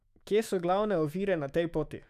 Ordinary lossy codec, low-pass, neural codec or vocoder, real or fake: none; 14.4 kHz; autoencoder, 48 kHz, 128 numbers a frame, DAC-VAE, trained on Japanese speech; fake